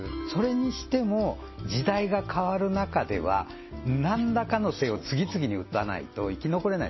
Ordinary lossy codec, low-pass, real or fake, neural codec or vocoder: MP3, 24 kbps; 7.2 kHz; real; none